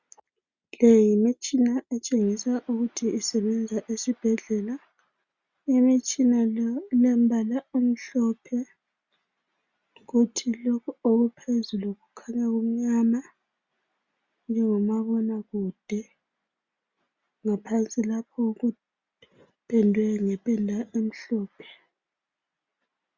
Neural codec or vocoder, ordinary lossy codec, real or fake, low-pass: none; Opus, 64 kbps; real; 7.2 kHz